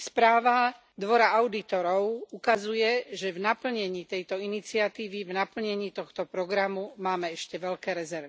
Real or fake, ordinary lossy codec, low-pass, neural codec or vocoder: real; none; none; none